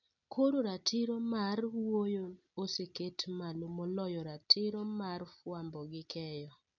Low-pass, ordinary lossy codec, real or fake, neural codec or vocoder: 7.2 kHz; none; real; none